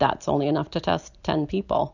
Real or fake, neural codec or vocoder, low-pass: real; none; 7.2 kHz